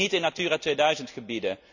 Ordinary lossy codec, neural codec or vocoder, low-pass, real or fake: none; none; 7.2 kHz; real